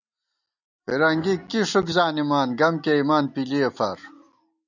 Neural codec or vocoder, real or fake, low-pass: none; real; 7.2 kHz